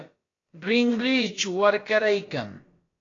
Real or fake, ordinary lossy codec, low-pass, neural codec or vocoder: fake; AAC, 32 kbps; 7.2 kHz; codec, 16 kHz, about 1 kbps, DyCAST, with the encoder's durations